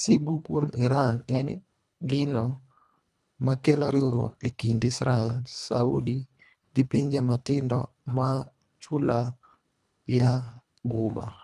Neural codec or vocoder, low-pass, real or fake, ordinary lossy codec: codec, 24 kHz, 1.5 kbps, HILCodec; none; fake; none